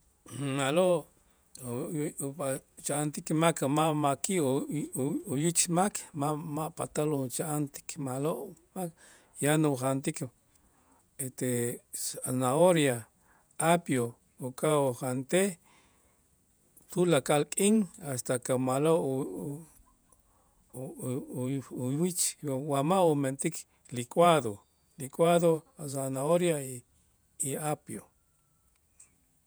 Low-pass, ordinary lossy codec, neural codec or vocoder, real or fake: none; none; vocoder, 48 kHz, 128 mel bands, Vocos; fake